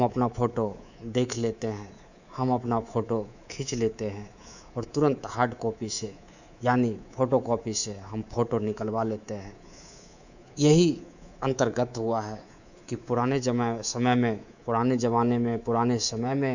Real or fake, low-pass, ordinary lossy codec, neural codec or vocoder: fake; 7.2 kHz; none; codec, 24 kHz, 3.1 kbps, DualCodec